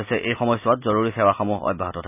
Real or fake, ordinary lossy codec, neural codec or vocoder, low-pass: real; none; none; 3.6 kHz